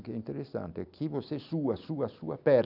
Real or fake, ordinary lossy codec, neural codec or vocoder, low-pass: real; none; none; 5.4 kHz